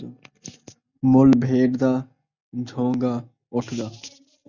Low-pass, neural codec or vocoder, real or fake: 7.2 kHz; none; real